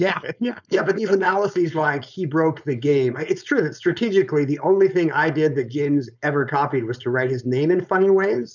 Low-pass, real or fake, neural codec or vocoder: 7.2 kHz; fake; codec, 16 kHz, 4.8 kbps, FACodec